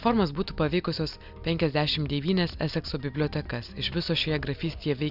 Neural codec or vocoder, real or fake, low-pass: none; real; 5.4 kHz